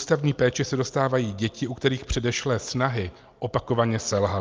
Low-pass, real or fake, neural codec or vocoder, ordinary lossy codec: 7.2 kHz; real; none; Opus, 32 kbps